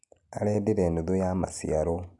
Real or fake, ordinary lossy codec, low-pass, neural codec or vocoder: real; none; 10.8 kHz; none